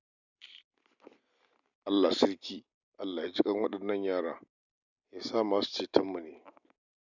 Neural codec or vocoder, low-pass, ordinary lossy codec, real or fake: none; 7.2 kHz; none; real